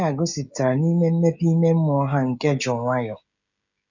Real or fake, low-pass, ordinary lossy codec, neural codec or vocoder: fake; 7.2 kHz; none; codec, 16 kHz, 16 kbps, FreqCodec, smaller model